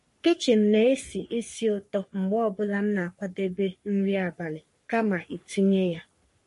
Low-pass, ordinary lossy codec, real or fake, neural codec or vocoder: 14.4 kHz; MP3, 48 kbps; fake; codec, 44.1 kHz, 3.4 kbps, Pupu-Codec